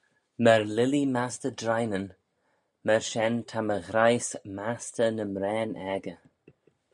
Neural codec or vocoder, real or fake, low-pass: none; real; 10.8 kHz